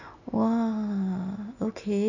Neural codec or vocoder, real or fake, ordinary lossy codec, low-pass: none; real; none; 7.2 kHz